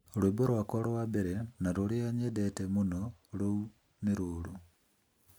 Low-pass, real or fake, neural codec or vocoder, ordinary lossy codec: none; real; none; none